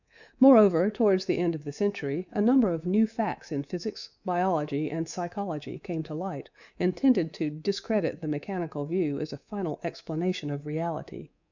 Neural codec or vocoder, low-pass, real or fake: codec, 24 kHz, 3.1 kbps, DualCodec; 7.2 kHz; fake